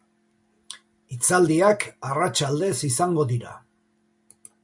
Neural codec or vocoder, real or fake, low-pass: none; real; 10.8 kHz